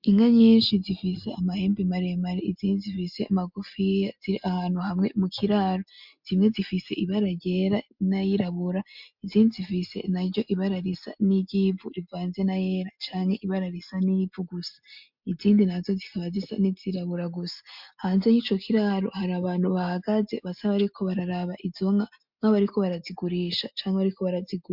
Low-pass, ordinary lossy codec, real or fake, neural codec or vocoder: 5.4 kHz; MP3, 48 kbps; real; none